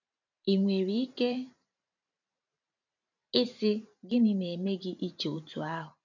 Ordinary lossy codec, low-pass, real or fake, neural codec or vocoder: none; 7.2 kHz; fake; vocoder, 44.1 kHz, 128 mel bands every 512 samples, BigVGAN v2